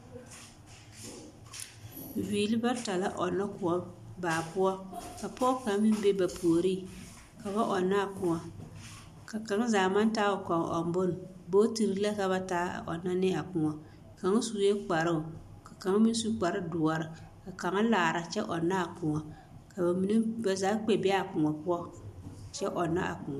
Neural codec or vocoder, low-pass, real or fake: none; 14.4 kHz; real